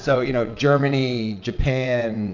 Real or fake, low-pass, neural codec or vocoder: fake; 7.2 kHz; vocoder, 22.05 kHz, 80 mel bands, WaveNeXt